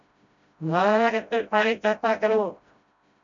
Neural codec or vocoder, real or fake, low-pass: codec, 16 kHz, 0.5 kbps, FreqCodec, smaller model; fake; 7.2 kHz